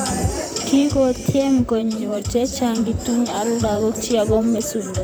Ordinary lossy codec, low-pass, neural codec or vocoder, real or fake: none; none; vocoder, 44.1 kHz, 128 mel bands, Pupu-Vocoder; fake